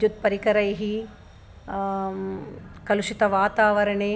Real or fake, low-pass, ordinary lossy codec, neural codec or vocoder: real; none; none; none